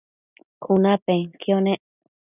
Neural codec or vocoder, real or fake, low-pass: none; real; 3.6 kHz